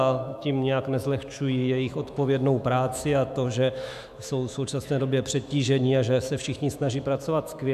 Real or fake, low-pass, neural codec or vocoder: fake; 14.4 kHz; autoencoder, 48 kHz, 128 numbers a frame, DAC-VAE, trained on Japanese speech